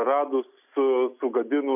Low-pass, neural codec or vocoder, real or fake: 3.6 kHz; none; real